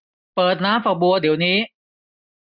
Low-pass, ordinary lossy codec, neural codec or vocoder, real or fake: 5.4 kHz; none; none; real